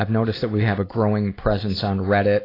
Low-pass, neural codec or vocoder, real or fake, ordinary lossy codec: 5.4 kHz; none; real; AAC, 24 kbps